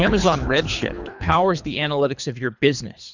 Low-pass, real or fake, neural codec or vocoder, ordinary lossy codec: 7.2 kHz; fake; codec, 24 kHz, 3 kbps, HILCodec; Opus, 64 kbps